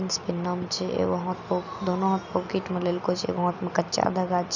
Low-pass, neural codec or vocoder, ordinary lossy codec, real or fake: 7.2 kHz; none; none; real